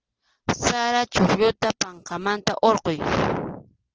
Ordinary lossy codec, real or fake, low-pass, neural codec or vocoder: Opus, 16 kbps; real; 7.2 kHz; none